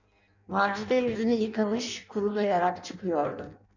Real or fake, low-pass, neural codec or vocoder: fake; 7.2 kHz; codec, 16 kHz in and 24 kHz out, 0.6 kbps, FireRedTTS-2 codec